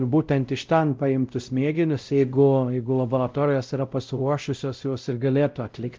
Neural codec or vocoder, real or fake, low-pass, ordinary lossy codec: codec, 16 kHz, 0.5 kbps, X-Codec, WavLM features, trained on Multilingual LibriSpeech; fake; 7.2 kHz; Opus, 24 kbps